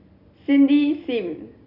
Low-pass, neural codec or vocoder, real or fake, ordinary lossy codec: 5.4 kHz; none; real; none